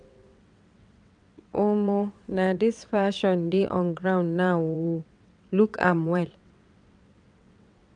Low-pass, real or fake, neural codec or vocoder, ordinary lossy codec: 9.9 kHz; fake; vocoder, 22.05 kHz, 80 mel bands, WaveNeXt; none